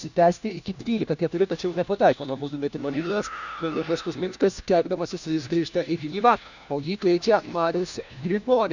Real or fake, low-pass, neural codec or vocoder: fake; 7.2 kHz; codec, 16 kHz, 1 kbps, FunCodec, trained on LibriTTS, 50 frames a second